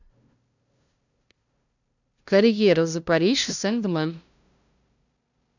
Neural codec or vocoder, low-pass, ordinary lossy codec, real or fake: codec, 16 kHz, 0.5 kbps, FunCodec, trained on LibriTTS, 25 frames a second; 7.2 kHz; none; fake